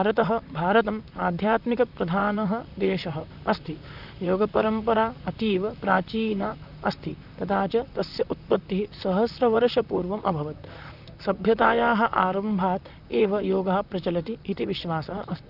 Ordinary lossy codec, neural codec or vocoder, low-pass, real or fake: none; vocoder, 44.1 kHz, 128 mel bands, Pupu-Vocoder; 5.4 kHz; fake